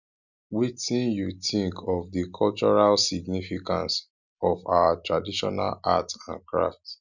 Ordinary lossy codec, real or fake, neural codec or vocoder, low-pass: none; real; none; 7.2 kHz